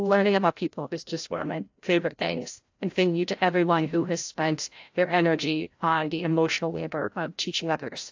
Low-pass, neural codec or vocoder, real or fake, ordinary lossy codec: 7.2 kHz; codec, 16 kHz, 0.5 kbps, FreqCodec, larger model; fake; AAC, 48 kbps